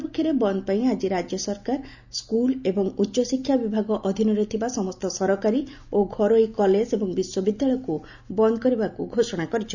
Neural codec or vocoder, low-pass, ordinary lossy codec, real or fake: none; 7.2 kHz; none; real